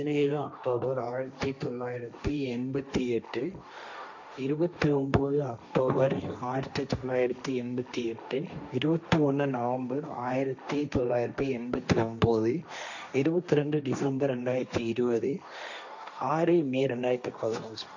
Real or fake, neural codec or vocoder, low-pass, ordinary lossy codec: fake; codec, 16 kHz, 1.1 kbps, Voila-Tokenizer; 7.2 kHz; none